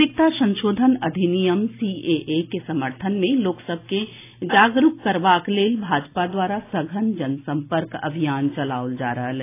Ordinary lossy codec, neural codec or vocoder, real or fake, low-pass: AAC, 24 kbps; none; real; 3.6 kHz